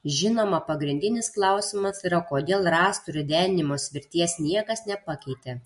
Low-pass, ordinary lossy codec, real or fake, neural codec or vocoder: 14.4 kHz; MP3, 48 kbps; real; none